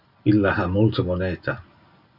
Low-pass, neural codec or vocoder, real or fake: 5.4 kHz; none; real